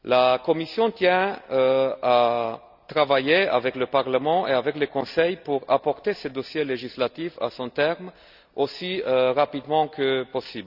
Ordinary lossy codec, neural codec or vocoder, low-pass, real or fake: none; none; 5.4 kHz; real